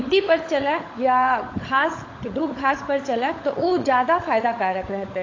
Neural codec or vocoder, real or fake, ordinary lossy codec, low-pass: codec, 16 kHz, 16 kbps, FunCodec, trained on LibriTTS, 50 frames a second; fake; AAC, 32 kbps; 7.2 kHz